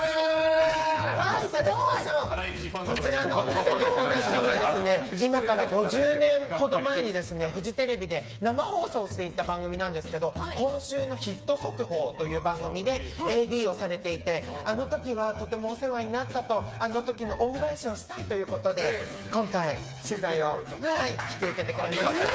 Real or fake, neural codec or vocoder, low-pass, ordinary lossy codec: fake; codec, 16 kHz, 4 kbps, FreqCodec, smaller model; none; none